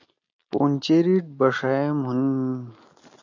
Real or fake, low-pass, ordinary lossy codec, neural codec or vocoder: real; 7.2 kHz; AAC, 48 kbps; none